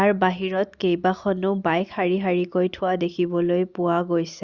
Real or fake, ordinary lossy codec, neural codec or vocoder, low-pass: real; Opus, 64 kbps; none; 7.2 kHz